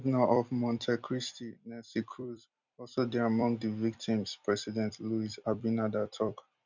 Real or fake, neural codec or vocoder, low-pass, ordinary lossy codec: fake; vocoder, 44.1 kHz, 128 mel bands every 256 samples, BigVGAN v2; 7.2 kHz; none